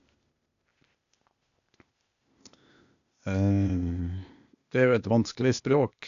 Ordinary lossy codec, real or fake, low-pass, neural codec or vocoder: none; fake; 7.2 kHz; codec, 16 kHz, 0.8 kbps, ZipCodec